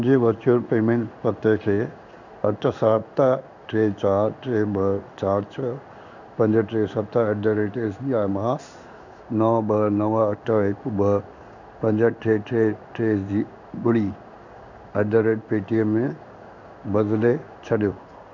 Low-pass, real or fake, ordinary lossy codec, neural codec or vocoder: 7.2 kHz; fake; none; codec, 16 kHz in and 24 kHz out, 1 kbps, XY-Tokenizer